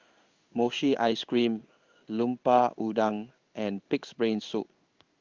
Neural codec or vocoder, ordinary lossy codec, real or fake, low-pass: codec, 16 kHz in and 24 kHz out, 1 kbps, XY-Tokenizer; Opus, 32 kbps; fake; 7.2 kHz